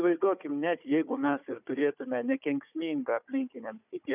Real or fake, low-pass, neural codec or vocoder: fake; 3.6 kHz; codec, 16 kHz, 4 kbps, FunCodec, trained on Chinese and English, 50 frames a second